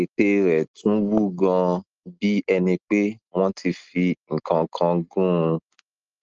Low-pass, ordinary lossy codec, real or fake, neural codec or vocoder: 7.2 kHz; Opus, 24 kbps; real; none